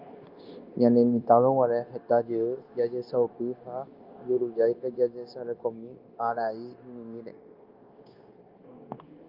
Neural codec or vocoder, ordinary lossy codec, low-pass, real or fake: codec, 16 kHz, 0.9 kbps, LongCat-Audio-Codec; Opus, 32 kbps; 5.4 kHz; fake